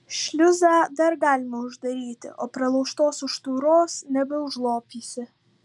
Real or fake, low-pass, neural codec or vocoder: real; 10.8 kHz; none